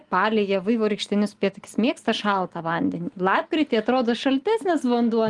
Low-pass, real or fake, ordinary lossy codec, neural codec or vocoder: 10.8 kHz; real; Opus, 24 kbps; none